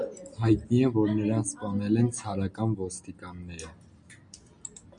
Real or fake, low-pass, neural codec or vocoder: real; 9.9 kHz; none